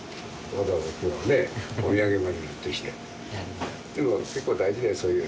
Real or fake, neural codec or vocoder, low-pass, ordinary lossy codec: real; none; none; none